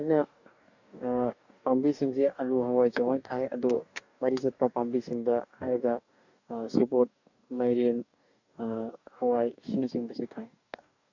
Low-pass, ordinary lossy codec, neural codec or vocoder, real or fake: 7.2 kHz; none; codec, 44.1 kHz, 2.6 kbps, DAC; fake